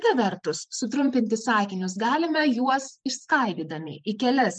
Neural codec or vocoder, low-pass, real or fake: vocoder, 44.1 kHz, 128 mel bands every 512 samples, BigVGAN v2; 9.9 kHz; fake